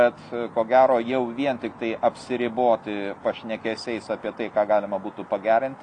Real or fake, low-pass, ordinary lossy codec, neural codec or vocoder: real; 9.9 kHz; AAC, 48 kbps; none